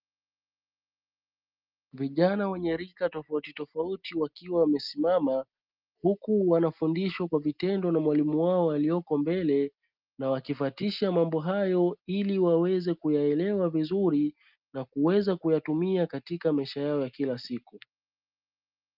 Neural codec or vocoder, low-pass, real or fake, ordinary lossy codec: none; 5.4 kHz; real; Opus, 24 kbps